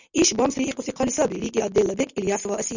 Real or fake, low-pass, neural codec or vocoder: real; 7.2 kHz; none